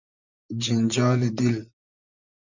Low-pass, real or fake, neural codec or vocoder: 7.2 kHz; fake; vocoder, 44.1 kHz, 128 mel bands, Pupu-Vocoder